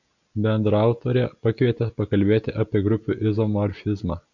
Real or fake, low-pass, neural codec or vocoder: real; 7.2 kHz; none